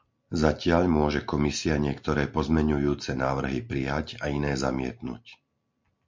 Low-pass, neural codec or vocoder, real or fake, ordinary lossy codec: 7.2 kHz; none; real; MP3, 48 kbps